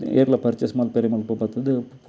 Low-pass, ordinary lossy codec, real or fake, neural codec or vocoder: none; none; real; none